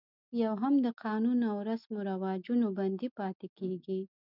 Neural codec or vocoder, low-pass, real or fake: none; 5.4 kHz; real